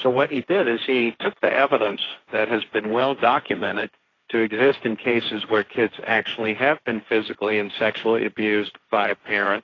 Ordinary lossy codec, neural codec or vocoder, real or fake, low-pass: AAC, 32 kbps; codec, 16 kHz, 1.1 kbps, Voila-Tokenizer; fake; 7.2 kHz